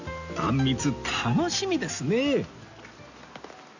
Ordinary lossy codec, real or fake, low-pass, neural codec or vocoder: none; real; 7.2 kHz; none